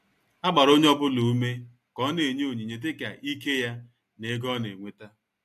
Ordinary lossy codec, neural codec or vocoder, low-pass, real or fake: AAC, 64 kbps; none; 14.4 kHz; real